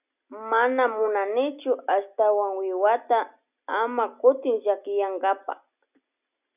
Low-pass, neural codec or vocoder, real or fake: 3.6 kHz; none; real